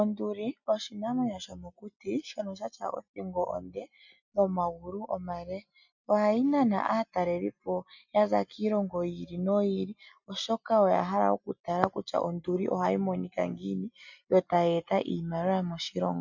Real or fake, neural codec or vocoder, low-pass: real; none; 7.2 kHz